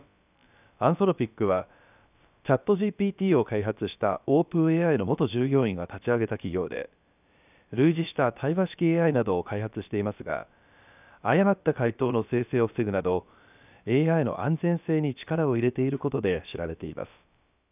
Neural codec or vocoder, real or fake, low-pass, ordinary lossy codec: codec, 16 kHz, about 1 kbps, DyCAST, with the encoder's durations; fake; 3.6 kHz; none